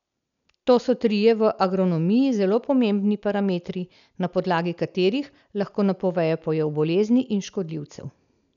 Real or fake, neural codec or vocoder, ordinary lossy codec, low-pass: real; none; none; 7.2 kHz